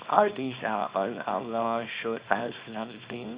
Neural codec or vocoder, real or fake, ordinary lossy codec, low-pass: codec, 24 kHz, 0.9 kbps, WavTokenizer, small release; fake; Opus, 64 kbps; 3.6 kHz